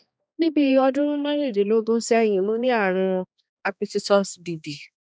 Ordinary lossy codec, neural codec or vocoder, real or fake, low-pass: none; codec, 16 kHz, 2 kbps, X-Codec, HuBERT features, trained on balanced general audio; fake; none